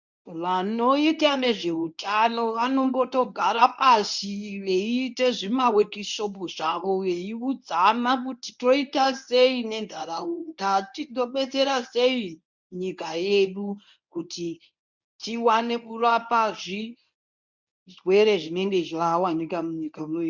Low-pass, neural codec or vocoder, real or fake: 7.2 kHz; codec, 24 kHz, 0.9 kbps, WavTokenizer, medium speech release version 1; fake